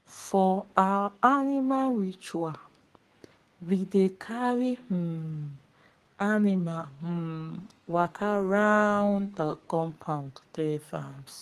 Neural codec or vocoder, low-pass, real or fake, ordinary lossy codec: codec, 32 kHz, 1.9 kbps, SNAC; 14.4 kHz; fake; Opus, 24 kbps